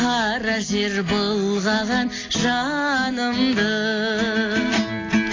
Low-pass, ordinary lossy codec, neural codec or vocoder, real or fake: 7.2 kHz; AAC, 32 kbps; none; real